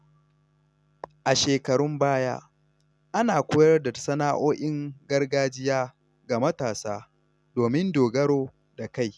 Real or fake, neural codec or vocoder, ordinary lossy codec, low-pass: real; none; none; none